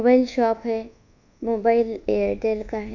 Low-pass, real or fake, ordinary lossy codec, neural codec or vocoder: 7.2 kHz; fake; none; codec, 24 kHz, 1.2 kbps, DualCodec